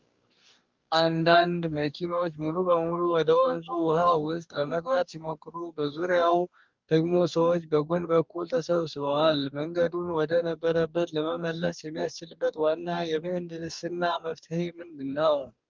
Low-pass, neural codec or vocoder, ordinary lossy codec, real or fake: 7.2 kHz; codec, 44.1 kHz, 2.6 kbps, DAC; Opus, 24 kbps; fake